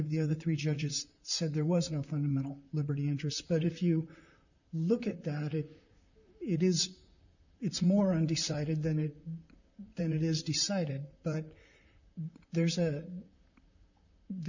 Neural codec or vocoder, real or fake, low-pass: vocoder, 44.1 kHz, 128 mel bands, Pupu-Vocoder; fake; 7.2 kHz